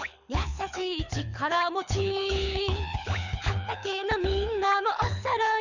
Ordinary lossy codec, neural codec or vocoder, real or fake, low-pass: none; codec, 24 kHz, 6 kbps, HILCodec; fake; 7.2 kHz